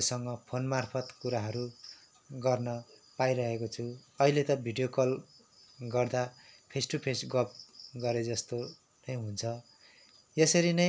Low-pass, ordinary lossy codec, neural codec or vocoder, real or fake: none; none; none; real